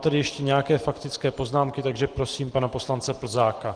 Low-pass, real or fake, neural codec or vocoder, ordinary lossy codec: 9.9 kHz; real; none; Opus, 16 kbps